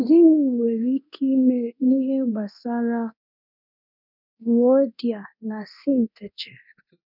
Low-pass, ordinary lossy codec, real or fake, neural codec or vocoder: 5.4 kHz; none; fake; codec, 24 kHz, 0.9 kbps, DualCodec